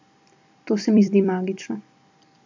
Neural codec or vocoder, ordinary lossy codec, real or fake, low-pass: vocoder, 44.1 kHz, 128 mel bands every 256 samples, BigVGAN v2; MP3, 48 kbps; fake; 7.2 kHz